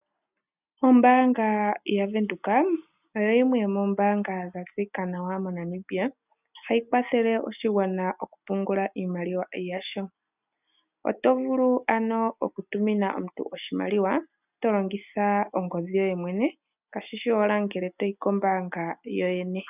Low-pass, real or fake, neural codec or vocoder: 3.6 kHz; real; none